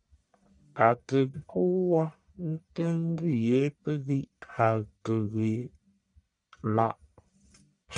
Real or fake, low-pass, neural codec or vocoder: fake; 10.8 kHz; codec, 44.1 kHz, 1.7 kbps, Pupu-Codec